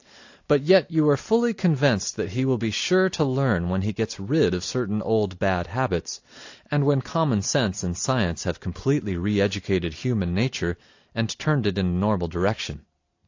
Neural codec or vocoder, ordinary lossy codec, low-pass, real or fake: none; AAC, 48 kbps; 7.2 kHz; real